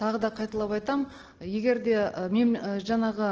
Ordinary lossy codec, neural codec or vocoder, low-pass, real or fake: Opus, 16 kbps; none; 7.2 kHz; real